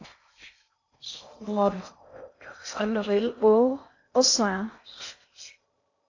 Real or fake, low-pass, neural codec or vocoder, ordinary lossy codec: fake; 7.2 kHz; codec, 16 kHz in and 24 kHz out, 0.6 kbps, FocalCodec, streaming, 4096 codes; AAC, 48 kbps